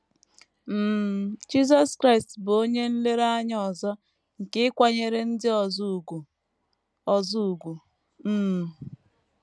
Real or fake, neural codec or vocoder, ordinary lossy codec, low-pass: real; none; none; none